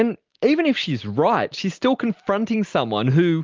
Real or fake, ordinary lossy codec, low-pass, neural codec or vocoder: real; Opus, 32 kbps; 7.2 kHz; none